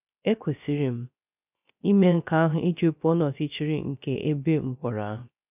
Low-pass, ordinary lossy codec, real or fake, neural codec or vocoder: 3.6 kHz; none; fake; codec, 16 kHz, 0.3 kbps, FocalCodec